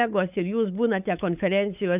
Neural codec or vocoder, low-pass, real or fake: codec, 16 kHz, 8 kbps, FunCodec, trained on LibriTTS, 25 frames a second; 3.6 kHz; fake